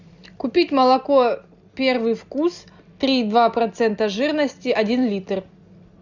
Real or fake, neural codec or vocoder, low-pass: real; none; 7.2 kHz